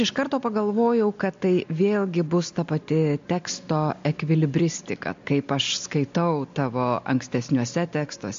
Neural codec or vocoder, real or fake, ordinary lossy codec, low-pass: none; real; MP3, 48 kbps; 7.2 kHz